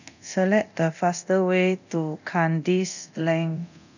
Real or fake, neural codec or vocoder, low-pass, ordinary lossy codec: fake; codec, 24 kHz, 0.9 kbps, DualCodec; 7.2 kHz; none